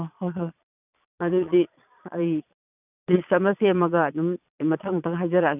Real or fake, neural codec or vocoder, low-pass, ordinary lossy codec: fake; vocoder, 22.05 kHz, 80 mel bands, Vocos; 3.6 kHz; none